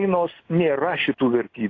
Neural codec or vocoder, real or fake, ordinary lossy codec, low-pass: none; real; AAC, 32 kbps; 7.2 kHz